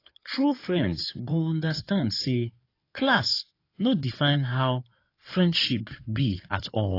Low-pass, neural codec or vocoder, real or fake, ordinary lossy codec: 5.4 kHz; codec, 16 kHz in and 24 kHz out, 2.2 kbps, FireRedTTS-2 codec; fake; AAC, 32 kbps